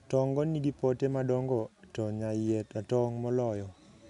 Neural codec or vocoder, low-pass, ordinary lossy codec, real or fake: none; 10.8 kHz; none; real